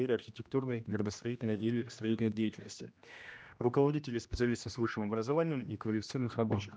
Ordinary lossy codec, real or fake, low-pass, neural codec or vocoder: none; fake; none; codec, 16 kHz, 1 kbps, X-Codec, HuBERT features, trained on general audio